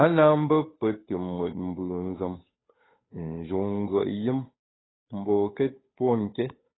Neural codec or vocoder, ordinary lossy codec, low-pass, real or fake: codec, 16 kHz, 8 kbps, FunCodec, trained on Chinese and English, 25 frames a second; AAC, 16 kbps; 7.2 kHz; fake